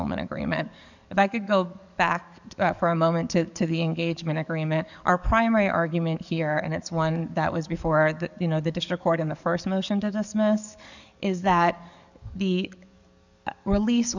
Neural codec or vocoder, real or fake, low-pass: codec, 44.1 kHz, 7.8 kbps, DAC; fake; 7.2 kHz